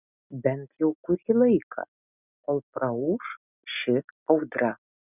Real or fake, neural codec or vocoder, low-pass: real; none; 3.6 kHz